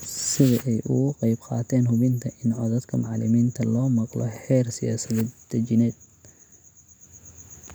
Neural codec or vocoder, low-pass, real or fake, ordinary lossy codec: none; none; real; none